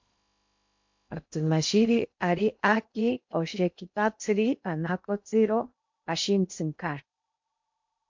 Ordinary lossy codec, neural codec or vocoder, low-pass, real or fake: MP3, 48 kbps; codec, 16 kHz in and 24 kHz out, 0.6 kbps, FocalCodec, streaming, 2048 codes; 7.2 kHz; fake